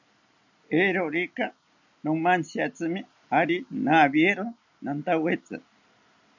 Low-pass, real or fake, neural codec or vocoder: 7.2 kHz; real; none